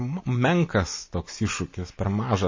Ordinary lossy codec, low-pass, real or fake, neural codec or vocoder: MP3, 32 kbps; 7.2 kHz; real; none